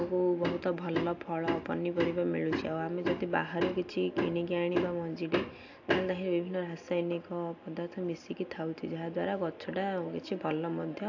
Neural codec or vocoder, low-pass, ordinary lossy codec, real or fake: none; 7.2 kHz; AAC, 48 kbps; real